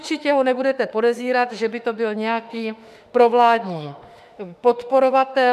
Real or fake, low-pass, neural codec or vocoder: fake; 14.4 kHz; autoencoder, 48 kHz, 32 numbers a frame, DAC-VAE, trained on Japanese speech